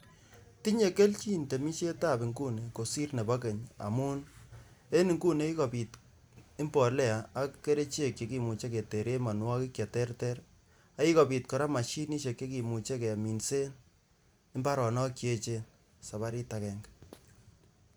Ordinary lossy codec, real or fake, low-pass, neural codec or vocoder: none; real; none; none